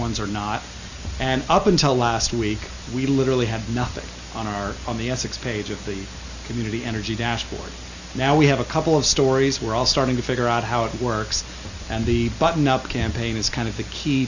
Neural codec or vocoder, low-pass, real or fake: none; 7.2 kHz; real